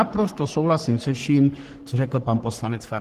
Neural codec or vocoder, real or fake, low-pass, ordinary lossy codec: codec, 44.1 kHz, 3.4 kbps, Pupu-Codec; fake; 14.4 kHz; Opus, 16 kbps